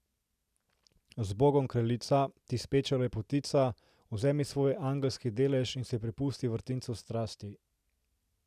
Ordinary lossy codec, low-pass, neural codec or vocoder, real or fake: none; 14.4 kHz; none; real